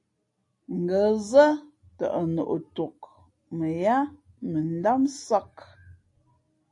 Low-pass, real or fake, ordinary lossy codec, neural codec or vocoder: 10.8 kHz; real; AAC, 48 kbps; none